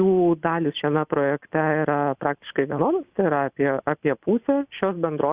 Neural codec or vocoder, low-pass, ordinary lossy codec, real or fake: none; 3.6 kHz; Opus, 64 kbps; real